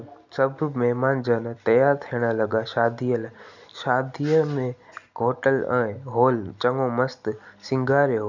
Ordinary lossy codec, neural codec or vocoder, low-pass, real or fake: none; none; 7.2 kHz; real